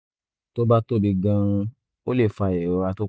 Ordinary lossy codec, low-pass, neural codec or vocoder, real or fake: none; none; none; real